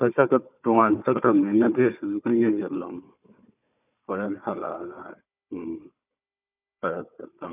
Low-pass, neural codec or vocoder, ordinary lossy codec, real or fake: 3.6 kHz; codec, 16 kHz, 4 kbps, FunCodec, trained on Chinese and English, 50 frames a second; none; fake